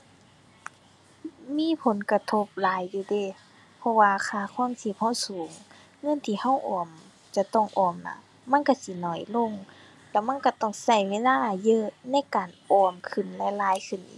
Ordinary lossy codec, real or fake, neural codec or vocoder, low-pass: none; real; none; none